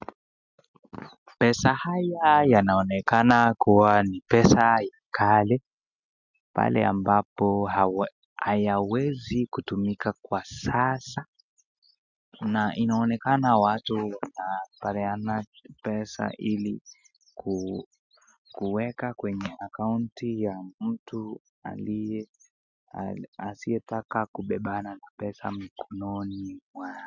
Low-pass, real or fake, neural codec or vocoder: 7.2 kHz; real; none